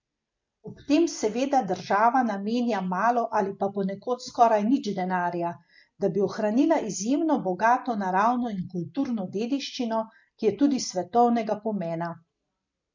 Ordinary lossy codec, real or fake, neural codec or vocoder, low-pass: MP3, 48 kbps; real; none; 7.2 kHz